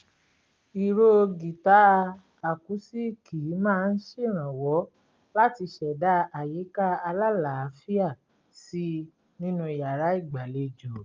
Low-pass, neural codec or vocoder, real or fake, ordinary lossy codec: 7.2 kHz; none; real; Opus, 32 kbps